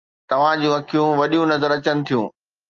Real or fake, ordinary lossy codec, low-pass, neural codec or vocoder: real; Opus, 24 kbps; 7.2 kHz; none